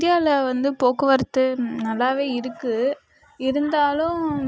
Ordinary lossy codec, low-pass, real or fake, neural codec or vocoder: none; none; real; none